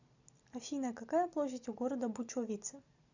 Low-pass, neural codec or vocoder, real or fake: 7.2 kHz; none; real